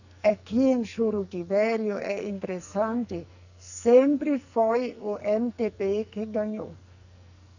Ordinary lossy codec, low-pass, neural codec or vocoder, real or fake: none; 7.2 kHz; codec, 44.1 kHz, 2.6 kbps, SNAC; fake